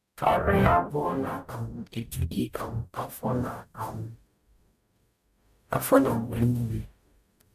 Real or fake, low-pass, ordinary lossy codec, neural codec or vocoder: fake; 14.4 kHz; none; codec, 44.1 kHz, 0.9 kbps, DAC